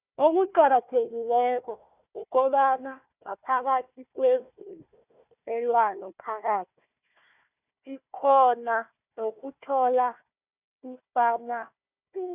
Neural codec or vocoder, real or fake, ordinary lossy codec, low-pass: codec, 16 kHz, 1 kbps, FunCodec, trained on Chinese and English, 50 frames a second; fake; none; 3.6 kHz